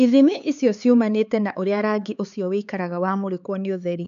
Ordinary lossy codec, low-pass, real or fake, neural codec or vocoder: AAC, 96 kbps; 7.2 kHz; fake; codec, 16 kHz, 4 kbps, X-Codec, HuBERT features, trained on LibriSpeech